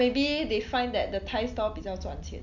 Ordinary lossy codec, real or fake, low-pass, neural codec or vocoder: none; real; 7.2 kHz; none